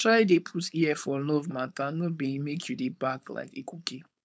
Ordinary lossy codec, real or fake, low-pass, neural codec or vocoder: none; fake; none; codec, 16 kHz, 4.8 kbps, FACodec